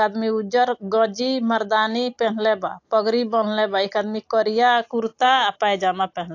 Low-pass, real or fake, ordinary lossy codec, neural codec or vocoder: 7.2 kHz; real; AAC, 48 kbps; none